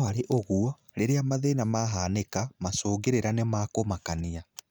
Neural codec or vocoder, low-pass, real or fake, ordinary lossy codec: none; none; real; none